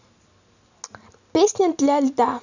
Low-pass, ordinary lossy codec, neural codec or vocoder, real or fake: 7.2 kHz; none; none; real